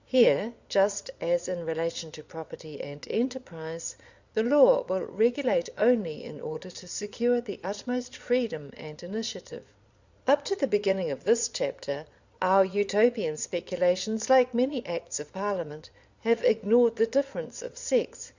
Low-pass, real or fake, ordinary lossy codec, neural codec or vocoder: 7.2 kHz; fake; Opus, 64 kbps; vocoder, 22.05 kHz, 80 mel bands, Vocos